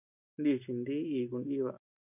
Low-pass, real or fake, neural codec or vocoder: 3.6 kHz; real; none